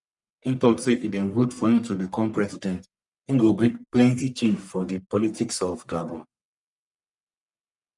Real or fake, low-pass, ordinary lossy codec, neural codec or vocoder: fake; 10.8 kHz; none; codec, 44.1 kHz, 1.7 kbps, Pupu-Codec